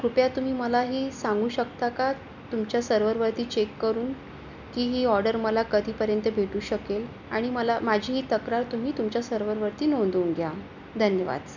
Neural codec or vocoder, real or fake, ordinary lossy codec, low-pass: none; real; none; 7.2 kHz